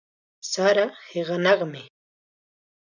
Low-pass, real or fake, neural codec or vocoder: 7.2 kHz; real; none